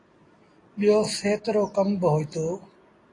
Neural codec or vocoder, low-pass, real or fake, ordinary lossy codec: none; 10.8 kHz; real; AAC, 32 kbps